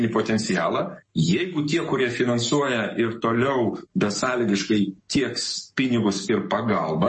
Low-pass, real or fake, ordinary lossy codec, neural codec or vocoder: 10.8 kHz; fake; MP3, 32 kbps; codec, 44.1 kHz, 7.8 kbps, Pupu-Codec